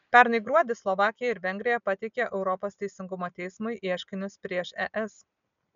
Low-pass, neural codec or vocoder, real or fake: 7.2 kHz; none; real